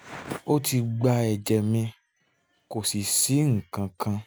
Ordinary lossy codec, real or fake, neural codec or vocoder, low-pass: none; real; none; none